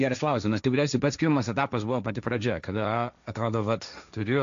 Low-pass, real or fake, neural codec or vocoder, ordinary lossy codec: 7.2 kHz; fake; codec, 16 kHz, 1.1 kbps, Voila-Tokenizer; AAC, 96 kbps